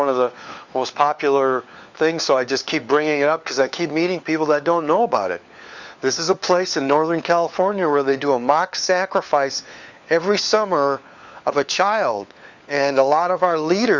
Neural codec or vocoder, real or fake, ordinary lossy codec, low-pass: codec, 16 kHz, 4 kbps, FunCodec, trained on LibriTTS, 50 frames a second; fake; Opus, 64 kbps; 7.2 kHz